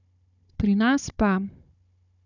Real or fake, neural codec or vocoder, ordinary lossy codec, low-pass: fake; codec, 16 kHz, 16 kbps, FunCodec, trained on Chinese and English, 50 frames a second; none; 7.2 kHz